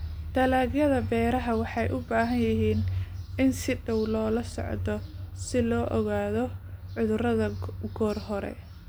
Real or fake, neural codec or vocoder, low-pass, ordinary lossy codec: real; none; none; none